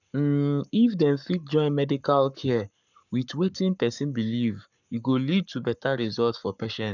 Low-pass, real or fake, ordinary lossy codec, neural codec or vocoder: 7.2 kHz; fake; none; codec, 44.1 kHz, 7.8 kbps, Pupu-Codec